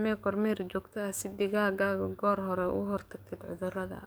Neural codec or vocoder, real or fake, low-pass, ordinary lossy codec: codec, 44.1 kHz, 7.8 kbps, DAC; fake; none; none